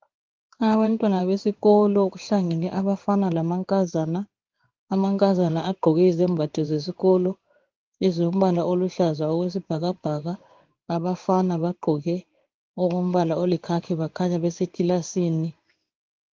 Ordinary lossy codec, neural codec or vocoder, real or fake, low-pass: Opus, 32 kbps; codec, 16 kHz in and 24 kHz out, 1 kbps, XY-Tokenizer; fake; 7.2 kHz